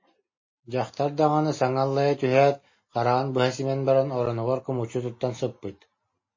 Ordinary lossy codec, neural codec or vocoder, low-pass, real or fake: MP3, 32 kbps; none; 7.2 kHz; real